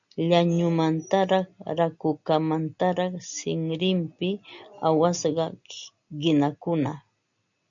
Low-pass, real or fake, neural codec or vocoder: 7.2 kHz; real; none